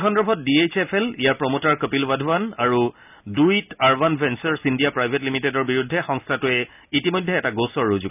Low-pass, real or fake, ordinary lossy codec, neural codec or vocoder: 3.6 kHz; real; none; none